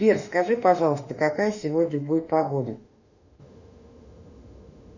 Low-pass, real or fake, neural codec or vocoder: 7.2 kHz; fake; autoencoder, 48 kHz, 32 numbers a frame, DAC-VAE, trained on Japanese speech